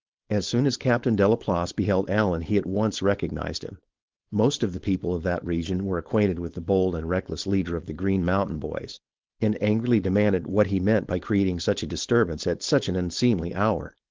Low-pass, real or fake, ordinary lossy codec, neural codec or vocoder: 7.2 kHz; fake; Opus, 16 kbps; codec, 16 kHz, 4.8 kbps, FACodec